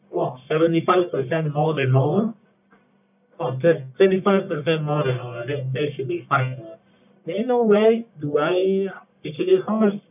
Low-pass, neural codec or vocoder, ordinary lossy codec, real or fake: 3.6 kHz; codec, 44.1 kHz, 1.7 kbps, Pupu-Codec; none; fake